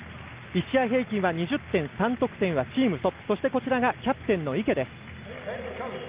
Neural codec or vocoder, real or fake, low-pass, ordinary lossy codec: none; real; 3.6 kHz; Opus, 32 kbps